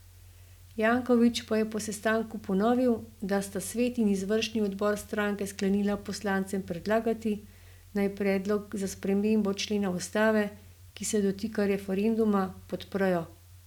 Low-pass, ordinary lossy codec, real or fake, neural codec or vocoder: 19.8 kHz; none; real; none